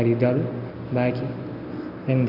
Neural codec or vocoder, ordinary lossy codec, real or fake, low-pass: none; none; real; 5.4 kHz